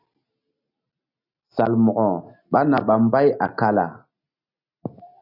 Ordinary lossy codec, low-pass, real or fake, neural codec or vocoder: AAC, 48 kbps; 5.4 kHz; real; none